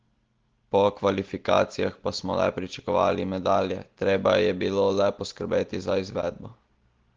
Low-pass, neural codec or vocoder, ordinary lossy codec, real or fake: 7.2 kHz; none; Opus, 16 kbps; real